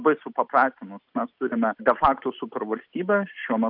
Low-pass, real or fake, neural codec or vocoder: 5.4 kHz; real; none